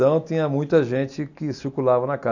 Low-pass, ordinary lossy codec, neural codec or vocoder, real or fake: 7.2 kHz; none; none; real